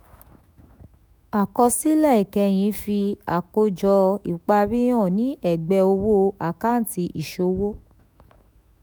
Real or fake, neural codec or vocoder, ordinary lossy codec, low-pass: fake; autoencoder, 48 kHz, 128 numbers a frame, DAC-VAE, trained on Japanese speech; none; none